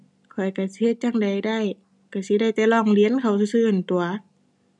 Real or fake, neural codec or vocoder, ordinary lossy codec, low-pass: real; none; none; 10.8 kHz